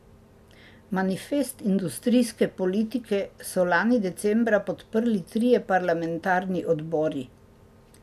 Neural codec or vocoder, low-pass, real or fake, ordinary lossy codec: none; 14.4 kHz; real; none